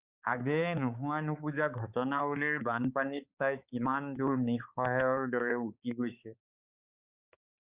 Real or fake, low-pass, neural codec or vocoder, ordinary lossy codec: fake; 3.6 kHz; codec, 16 kHz, 4 kbps, X-Codec, HuBERT features, trained on balanced general audio; Opus, 64 kbps